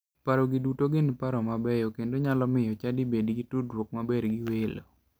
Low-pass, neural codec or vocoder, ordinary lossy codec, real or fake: none; none; none; real